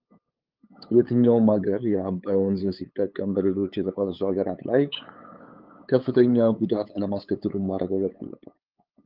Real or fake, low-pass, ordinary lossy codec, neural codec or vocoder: fake; 5.4 kHz; Opus, 32 kbps; codec, 16 kHz, 8 kbps, FunCodec, trained on LibriTTS, 25 frames a second